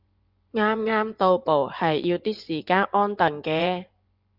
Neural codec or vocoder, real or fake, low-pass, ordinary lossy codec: vocoder, 24 kHz, 100 mel bands, Vocos; fake; 5.4 kHz; Opus, 24 kbps